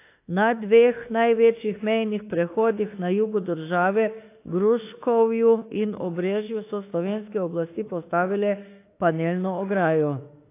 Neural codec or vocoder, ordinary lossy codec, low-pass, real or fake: autoencoder, 48 kHz, 32 numbers a frame, DAC-VAE, trained on Japanese speech; AAC, 24 kbps; 3.6 kHz; fake